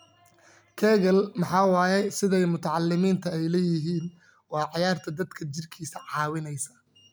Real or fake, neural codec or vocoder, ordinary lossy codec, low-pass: real; none; none; none